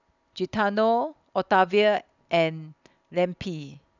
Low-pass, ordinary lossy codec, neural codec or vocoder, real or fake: 7.2 kHz; none; none; real